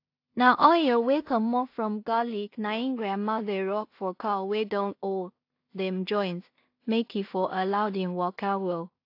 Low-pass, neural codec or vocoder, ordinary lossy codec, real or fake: 5.4 kHz; codec, 16 kHz in and 24 kHz out, 0.4 kbps, LongCat-Audio-Codec, two codebook decoder; AAC, 32 kbps; fake